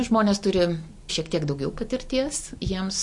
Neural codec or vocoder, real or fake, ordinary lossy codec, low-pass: none; real; MP3, 48 kbps; 10.8 kHz